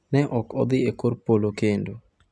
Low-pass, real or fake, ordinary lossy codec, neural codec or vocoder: none; real; none; none